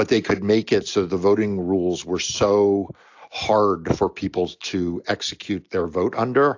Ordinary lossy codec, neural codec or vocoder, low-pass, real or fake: AAC, 48 kbps; none; 7.2 kHz; real